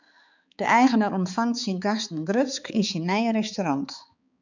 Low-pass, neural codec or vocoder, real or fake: 7.2 kHz; codec, 16 kHz, 4 kbps, X-Codec, HuBERT features, trained on balanced general audio; fake